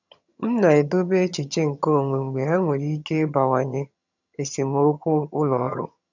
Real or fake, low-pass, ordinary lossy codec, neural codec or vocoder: fake; 7.2 kHz; none; vocoder, 22.05 kHz, 80 mel bands, HiFi-GAN